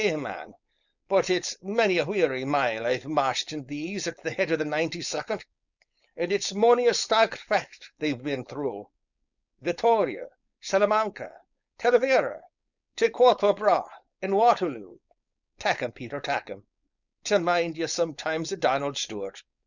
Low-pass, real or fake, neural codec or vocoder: 7.2 kHz; fake; codec, 16 kHz, 4.8 kbps, FACodec